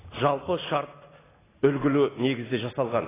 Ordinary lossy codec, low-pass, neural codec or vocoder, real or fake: AAC, 16 kbps; 3.6 kHz; none; real